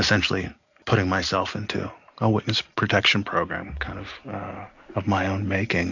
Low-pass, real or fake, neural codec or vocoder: 7.2 kHz; real; none